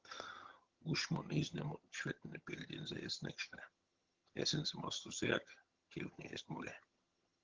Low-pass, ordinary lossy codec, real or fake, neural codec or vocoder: 7.2 kHz; Opus, 16 kbps; fake; vocoder, 22.05 kHz, 80 mel bands, HiFi-GAN